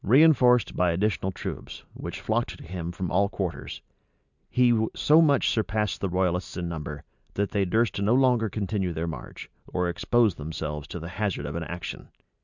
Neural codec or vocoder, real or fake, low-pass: none; real; 7.2 kHz